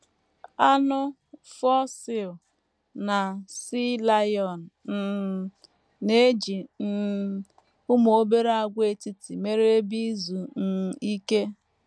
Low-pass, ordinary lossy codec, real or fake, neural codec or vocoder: none; none; real; none